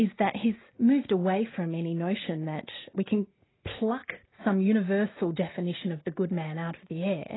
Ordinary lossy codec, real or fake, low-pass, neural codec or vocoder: AAC, 16 kbps; real; 7.2 kHz; none